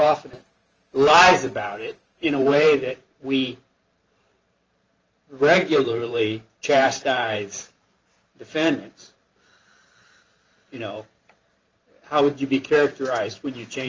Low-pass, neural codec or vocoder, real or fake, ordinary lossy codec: 7.2 kHz; vocoder, 44.1 kHz, 128 mel bands every 512 samples, BigVGAN v2; fake; Opus, 24 kbps